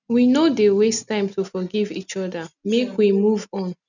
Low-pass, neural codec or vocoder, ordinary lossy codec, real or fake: 7.2 kHz; none; none; real